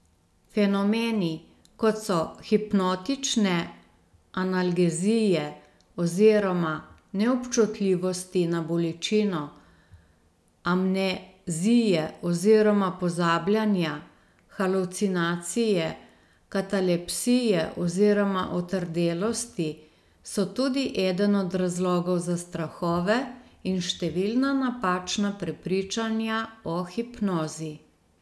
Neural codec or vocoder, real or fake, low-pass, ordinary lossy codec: none; real; none; none